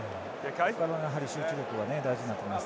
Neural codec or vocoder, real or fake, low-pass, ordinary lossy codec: none; real; none; none